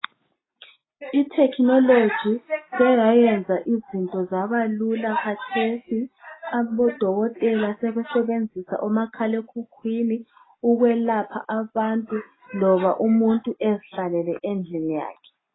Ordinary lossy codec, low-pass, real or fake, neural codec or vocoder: AAC, 16 kbps; 7.2 kHz; real; none